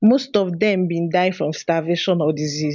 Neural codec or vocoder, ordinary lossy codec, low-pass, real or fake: none; none; 7.2 kHz; real